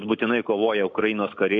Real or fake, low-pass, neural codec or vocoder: real; 7.2 kHz; none